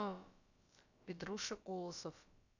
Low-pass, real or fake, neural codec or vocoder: 7.2 kHz; fake; codec, 16 kHz, about 1 kbps, DyCAST, with the encoder's durations